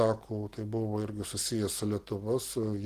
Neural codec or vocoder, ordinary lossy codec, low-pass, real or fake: none; Opus, 16 kbps; 14.4 kHz; real